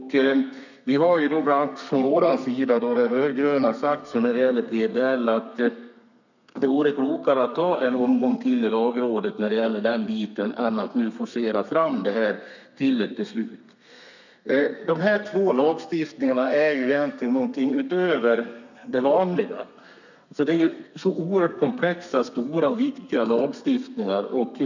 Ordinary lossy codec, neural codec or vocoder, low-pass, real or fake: none; codec, 32 kHz, 1.9 kbps, SNAC; 7.2 kHz; fake